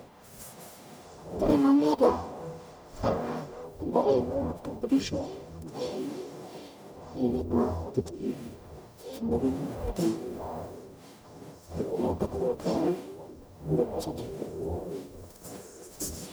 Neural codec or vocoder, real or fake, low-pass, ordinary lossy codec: codec, 44.1 kHz, 0.9 kbps, DAC; fake; none; none